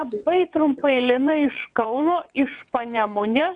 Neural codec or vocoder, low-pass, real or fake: vocoder, 22.05 kHz, 80 mel bands, WaveNeXt; 9.9 kHz; fake